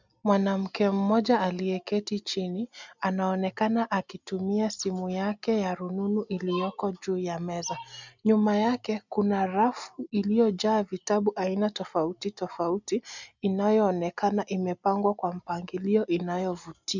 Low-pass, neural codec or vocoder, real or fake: 7.2 kHz; none; real